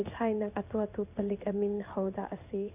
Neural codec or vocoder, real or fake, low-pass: codec, 16 kHz in and 24 kHz out, 1 kbps, XY-Tokenizer; fake; 3.6 kHz